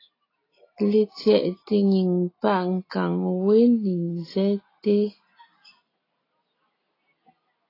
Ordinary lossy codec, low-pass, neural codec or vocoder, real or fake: AAC, 24 kbps; 5.4 kHz; none; real